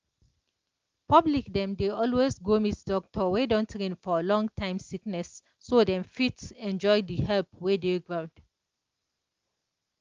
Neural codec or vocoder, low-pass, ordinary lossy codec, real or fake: none; 7.2 kHz; Opus, 32 kbps; real